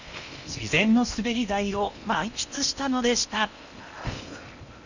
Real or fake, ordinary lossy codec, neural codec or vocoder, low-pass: fake; none; codec, 16 kHz in and 24 kHz out, 0.8 kbps, FocalCodec, streaming, 65536 codes; 7.2 kHz